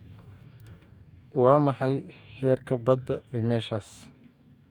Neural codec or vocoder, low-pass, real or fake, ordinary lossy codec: codec, 44.1 kHz, 2.6 kbps, DAC; 19.8 kHz; fake; none